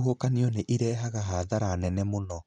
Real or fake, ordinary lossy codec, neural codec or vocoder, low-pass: real; none; none; 9.9 kHz